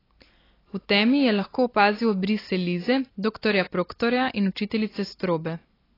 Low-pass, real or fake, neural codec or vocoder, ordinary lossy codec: 5.4 kHz; real; none; AAC, 24 kbps